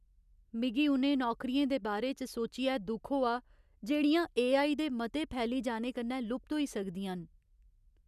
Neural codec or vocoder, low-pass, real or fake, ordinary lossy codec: none; 14.4 kHz; real; none